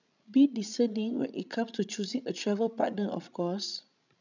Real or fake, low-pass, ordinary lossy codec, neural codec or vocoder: fake; 7.2 kHz; none; codec, 16 kHz, 16 kbps, FreqCodec, larger model